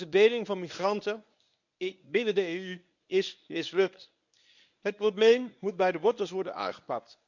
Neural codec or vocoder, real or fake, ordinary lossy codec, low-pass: codec, 24 kHz, 0.9 kbps, WavTokenizer, medium speech release version 1; fake; none; 7.2 kHz